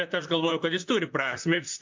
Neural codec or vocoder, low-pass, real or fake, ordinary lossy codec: vocoder, 44.1 kHz, 128 mel bands, Pupu-Vocoder; 7.2 kHz; fake; MP3, 64 kbps